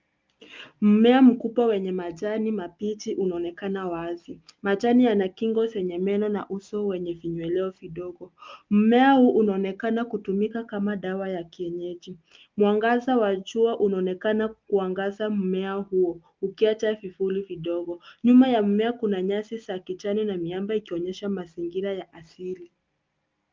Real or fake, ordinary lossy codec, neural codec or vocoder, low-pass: real; Opus, 32 kbps; none; 7.2 kHz